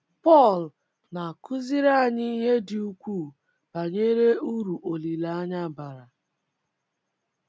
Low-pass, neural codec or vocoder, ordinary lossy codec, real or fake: none; none; none; real